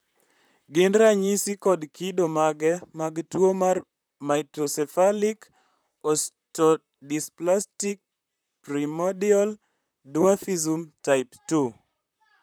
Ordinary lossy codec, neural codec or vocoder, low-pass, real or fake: none; vocoder, 44.1 kHz, 128 mel bands, Pupu-Vocoder; none; fake